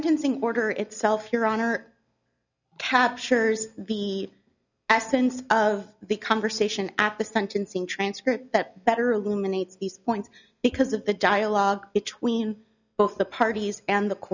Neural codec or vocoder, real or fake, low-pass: none; real; 7.2 kHz